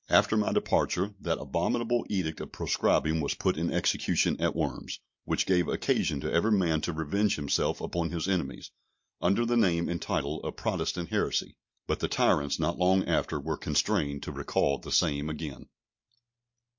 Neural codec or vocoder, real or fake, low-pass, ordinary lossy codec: none; real; 7.2 kHz; MP3, 48 kbps